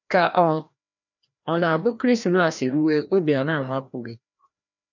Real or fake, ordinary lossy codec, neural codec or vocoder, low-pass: fake; none; codec, 16 kHz, 1 kbps, FreqCodec, larger model; 7.2 kHz